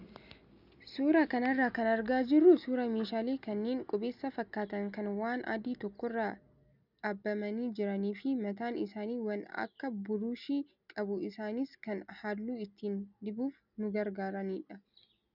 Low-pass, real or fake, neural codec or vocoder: 5.4 kHz; real; none